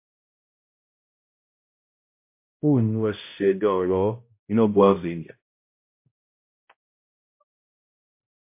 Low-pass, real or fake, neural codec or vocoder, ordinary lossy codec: 3.6 kHz; fake; codec, 16 kHz, 0.5 kbps, X-Codec, HuBERT features, trained on balanced general audio; MP3, 24 kbps